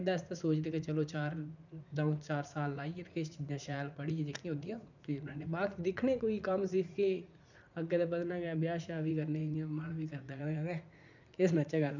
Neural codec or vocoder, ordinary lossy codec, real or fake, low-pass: vocoder, 44.1 kHz, 80 mel bands, Vocos; none; fake; 7.2 kHz